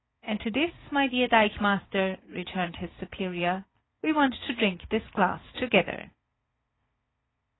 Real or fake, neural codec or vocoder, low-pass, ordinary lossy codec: fake; vocoder, 44.1 kHz, 80 mel bands, Vocos; 7.2 kHz; AAC, 16 kbps